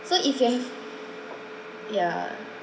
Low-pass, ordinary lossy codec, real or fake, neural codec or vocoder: none; none; real; none